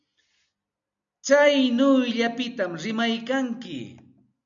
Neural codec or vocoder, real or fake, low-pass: none; real; 7.2 kHz